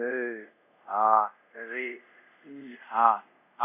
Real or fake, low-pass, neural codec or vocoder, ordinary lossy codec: fake; 3.6 kHz; codec, 24 kHz, 0.5 kbps, DualCodec; none